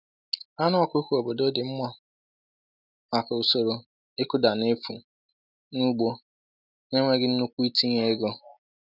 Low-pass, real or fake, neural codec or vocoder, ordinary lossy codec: 5.4 kHz; real; none; none